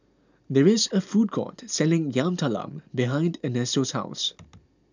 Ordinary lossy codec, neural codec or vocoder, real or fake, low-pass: none; none; real; 7.2 kHz